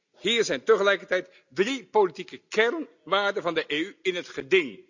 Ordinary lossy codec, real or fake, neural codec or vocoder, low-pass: none; real; none; 7.2 kHz